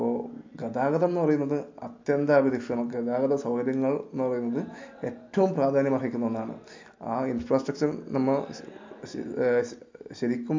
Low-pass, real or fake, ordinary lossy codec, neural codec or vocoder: 7.2 kHz; real; MP3, 48 kbps; none